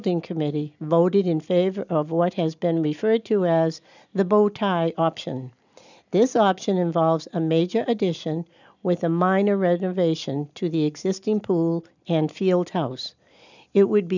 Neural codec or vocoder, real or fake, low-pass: none; real; 7.2 kHz